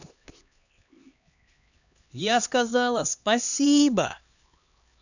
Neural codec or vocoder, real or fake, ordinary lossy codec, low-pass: codec, 16 kHz, 2 kbps, X-Codec, HuBERT features, trained on LibriSpeech; fake; none; 7.2 kHz